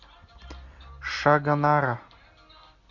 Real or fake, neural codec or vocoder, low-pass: real; none; 7.2 kHz